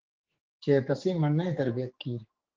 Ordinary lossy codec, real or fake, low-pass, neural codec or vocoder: Opus, 16 kbps; fake; 7.2 kHz; codec, 16 kHz, 4 kbps, X-Codec, HuBERT features, trained on general audio